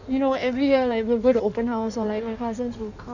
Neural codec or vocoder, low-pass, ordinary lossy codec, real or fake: codec, 16 kHz in and 24 kHz out, 1.1 kbps, FireRedTTS-2 codec; 7.2 kHz; none; fake